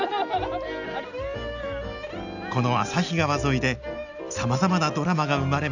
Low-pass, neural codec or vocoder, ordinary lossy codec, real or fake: 7.2 kHz; none; none; real